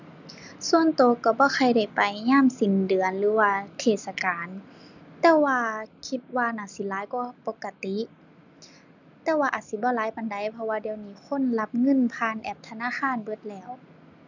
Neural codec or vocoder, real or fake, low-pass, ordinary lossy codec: none; real; 7.2 kHz; none